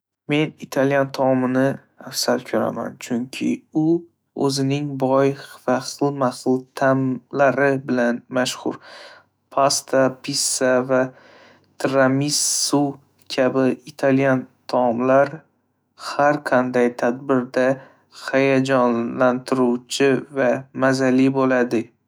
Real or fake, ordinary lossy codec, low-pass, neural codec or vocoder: fake; none; none; autoencoder, 48 kHz, 128 numbers a frame, DAC-VAE, trained on Japanese speech